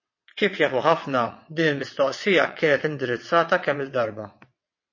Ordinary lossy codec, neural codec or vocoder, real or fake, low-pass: MP3, 32 kbps; vocoder, 22.05 kHz, 80 mel bands, WaveNeXt; fake; 7.2 kHz